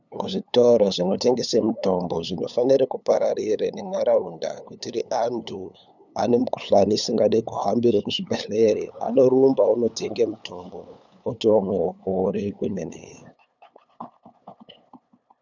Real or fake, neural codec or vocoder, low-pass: fake; codec, 16 kHz, 8 kbps, FunCodec, trained on LibriTTS, 25 frames a second; 7.2 kHz